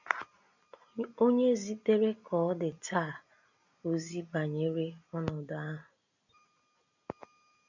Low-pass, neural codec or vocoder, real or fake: 7.2 kHz; none; real